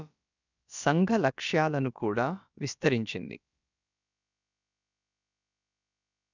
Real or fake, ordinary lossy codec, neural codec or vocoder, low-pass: fake; none; codec, 16 kHz, about 1 kbps, DyCAST, with the encoder's durations; 7.2 kHz